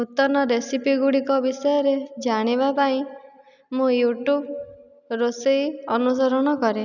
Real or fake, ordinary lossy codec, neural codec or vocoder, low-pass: real; none; none; 7.2 kHz